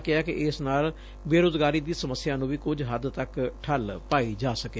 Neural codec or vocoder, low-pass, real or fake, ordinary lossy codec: none; none; real; none